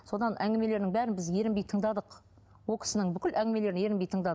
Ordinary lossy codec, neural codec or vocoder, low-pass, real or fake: none; none; none; real